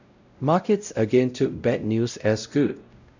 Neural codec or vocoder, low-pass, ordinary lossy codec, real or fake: codec, 16 kHz, 0.5 kbps, X-Codec, WavLM features, trained on Multilingual LibriSpeech; 7.2 kHz; none; fake